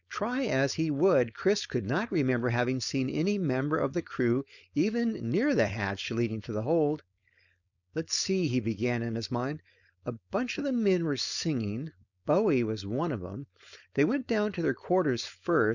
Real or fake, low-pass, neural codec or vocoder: fake; 7.2 kHz; codec, 16 kHz, 4.8 kbps, FACodec